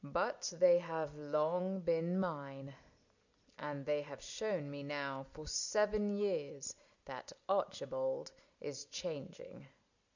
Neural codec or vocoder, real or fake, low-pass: none; real; 7.2 kHz